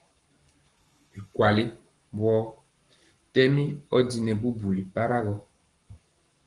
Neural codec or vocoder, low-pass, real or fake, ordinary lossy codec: codec, 44.1 kHz, 7.8 kbps, Pupu-Codec; 10.8 kHz; fake; Opus, 32 kbps